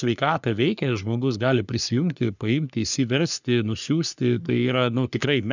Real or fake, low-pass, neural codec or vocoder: fake; 7.2 kHz; codec, 44.1 kHz, 3.4 kbps, Pupu-Codec